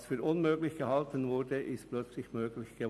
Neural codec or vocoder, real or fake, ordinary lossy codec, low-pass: none; real; none; none